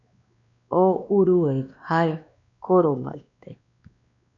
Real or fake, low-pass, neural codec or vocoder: fake; 7.2 kHz; codec, 16 kHz, 2 kbps, X-Codec, WavLM features, trained on Multilingual LibriSpeech